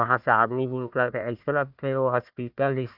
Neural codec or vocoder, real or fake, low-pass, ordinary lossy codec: codec, 16 kHz, 1 kbps, FunCodec, trained on Chinese and English, 50 frames a second; fake; 5.4 kHz; none